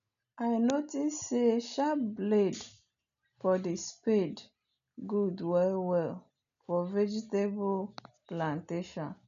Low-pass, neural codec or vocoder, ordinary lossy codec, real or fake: 7.2 kHz; none; none; real